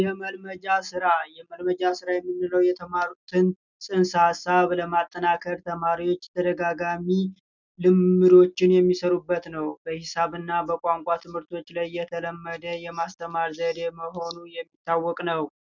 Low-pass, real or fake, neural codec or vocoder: 7.2 kHz; real; none